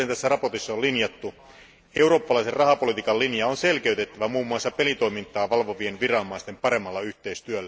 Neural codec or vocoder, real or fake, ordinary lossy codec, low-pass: none; real; none; none